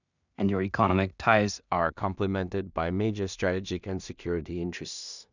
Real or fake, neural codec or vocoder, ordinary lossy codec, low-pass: fake; codec, 16 kHz in and 24 kHz out, 0.4 kbps, LongCat-Audio-Codec, two codebook decoder; none; 7.2 kHz